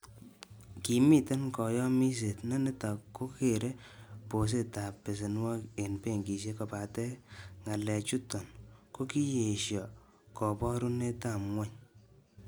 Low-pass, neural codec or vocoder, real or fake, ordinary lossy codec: none; none; real; none